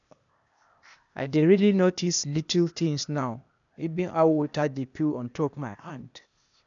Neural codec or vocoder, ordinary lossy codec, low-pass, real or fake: codec, 16 kHz, 0.8 kbps, ZipCodec; none; 7.2 kHz; fake